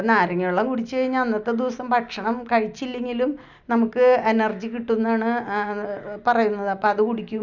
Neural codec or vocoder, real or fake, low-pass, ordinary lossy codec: none; real; 7.2 kHz; none